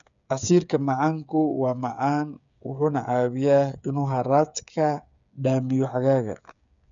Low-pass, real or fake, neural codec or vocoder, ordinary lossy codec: 7.2 kHz; fake; codec, 16 kHz, 8 kbps, FreqCodec, smaller model; none